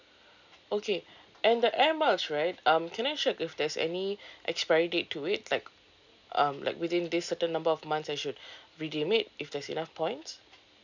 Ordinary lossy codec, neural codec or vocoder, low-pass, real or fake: none; none; 7.2 kHz; real